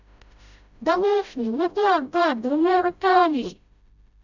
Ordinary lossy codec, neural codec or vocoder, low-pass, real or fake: none; codec, 16 kHz, 0.5 kbps, FreqCodec, smaller model; 7.2 kHz; fake